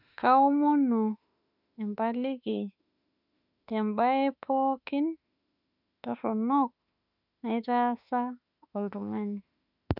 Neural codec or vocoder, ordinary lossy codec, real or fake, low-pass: autoencoder, 48 kHz, 32 numbers a frame, DAC-VAE, trained on Japanese speech; none; fake; 5.4 kHz